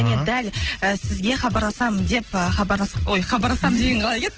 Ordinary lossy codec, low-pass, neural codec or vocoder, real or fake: Opus, 16 kbps; 7.2 kHz; none; real